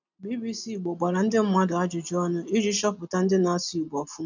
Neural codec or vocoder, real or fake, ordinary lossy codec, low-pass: none; real; none; 7.2 kHz